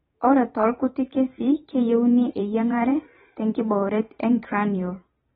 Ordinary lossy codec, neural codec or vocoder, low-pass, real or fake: AAC, 16 kbps; vocoder, 44.1 kHz, 128 mel bands every 512 samples, BigVGAN v2; 19.8 kHz; fake